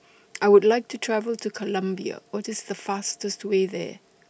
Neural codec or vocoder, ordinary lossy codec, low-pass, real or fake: none; none; none; real